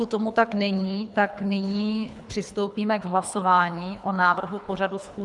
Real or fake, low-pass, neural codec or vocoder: fake; 10.8 kHz; codec, 24 kHz, 3 kbps, HILCodec